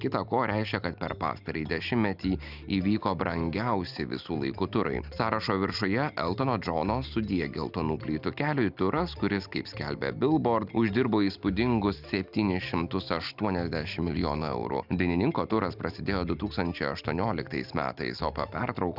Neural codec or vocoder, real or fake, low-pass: none; real; 5.4 kHz